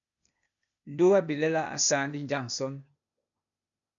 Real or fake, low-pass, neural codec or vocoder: fake; 7.2 kHz; codec, 16 kHz, 0.8 kbps, ZipCodec